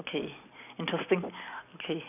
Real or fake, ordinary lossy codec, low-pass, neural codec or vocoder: real; none; 3.6 kHz; none